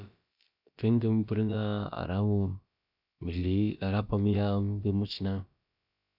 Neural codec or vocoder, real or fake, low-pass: codec, 16 kHz, about 1 kbps, DyCAST, with the encoder's durations; fake; 5.4 kHz